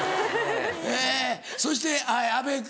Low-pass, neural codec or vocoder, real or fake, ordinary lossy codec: none; none; real; none